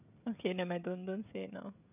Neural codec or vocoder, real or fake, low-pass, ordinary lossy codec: codec, 16 kHz, 16 kbps, FreqCodec, smaller model; fake; 3.6 kHz; none